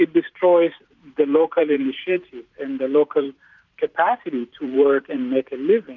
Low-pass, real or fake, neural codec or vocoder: 7.2 kHz; fake; vocoder, 44.1 kHz, 128 mel bands every 512 samples, BigVGAN v2